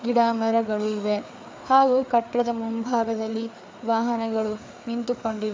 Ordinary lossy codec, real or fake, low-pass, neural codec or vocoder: none; fake; none; codec, 16 kHz, 4 kbps, FreqCodec, larger model